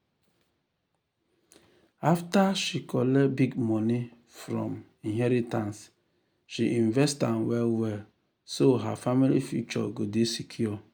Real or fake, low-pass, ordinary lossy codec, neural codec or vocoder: real; none; none; none